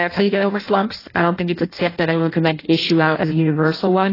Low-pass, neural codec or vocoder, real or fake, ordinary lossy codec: 5.4 kHz; codec, 16 kHz in and 24 kHz out, 0.6 kbps, FireRedTTS-2 codec; fake; AAC, 24 kbps